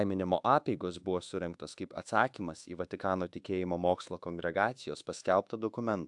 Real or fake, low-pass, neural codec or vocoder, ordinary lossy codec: fake; 10.8 kHz; codec, 24 kHz, 1.2 kbps, DualCodec; AAC, 64 kbps